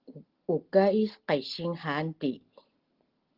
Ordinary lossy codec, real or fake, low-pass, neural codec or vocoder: Opus, 16 kbps; real; 5.4 kHz; none